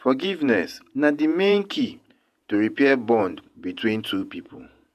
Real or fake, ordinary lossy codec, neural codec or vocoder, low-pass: fake; none; vocoder, 48 kHz, 128 mel bands, Vocos; 14.4 kHz